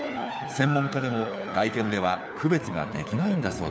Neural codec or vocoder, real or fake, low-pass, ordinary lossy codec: codec, 16 kHz, 4 kbps, FunCodec, trained on LibriTTS, 50 frames a second; fake; none; none